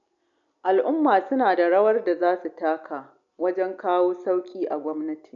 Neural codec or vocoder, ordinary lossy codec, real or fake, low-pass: none; none; real; 7.2 kHz